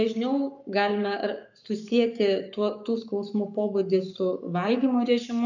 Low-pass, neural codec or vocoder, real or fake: 7.2 kHz; codec, 44.1 kHz, 7.8 kbps, Pupu-Codec; fake